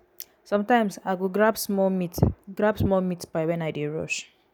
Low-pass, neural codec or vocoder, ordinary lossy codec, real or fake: none; none; none; real